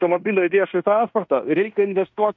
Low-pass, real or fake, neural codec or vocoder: 7.2 kHz; fake; codec, 16 kHz in and 24 kHz out, 0.9 kbps, LongCat-Audio-Codec, fine tuned four codebook decoder